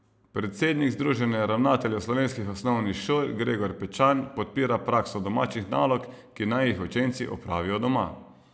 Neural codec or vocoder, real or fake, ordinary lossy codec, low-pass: none; real; none; none